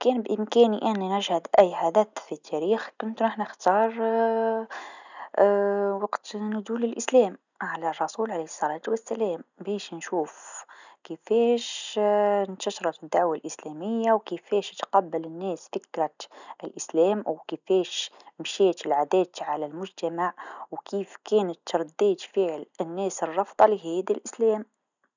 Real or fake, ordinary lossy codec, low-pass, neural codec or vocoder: real; none; 7.2 kHz; none